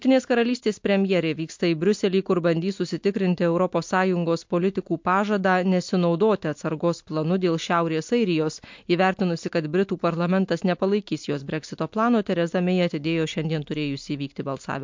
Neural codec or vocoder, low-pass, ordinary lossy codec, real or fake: none; 7.2 kHz; MP3, 48 kbps; real